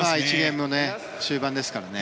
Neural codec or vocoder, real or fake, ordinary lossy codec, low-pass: none; real; none; none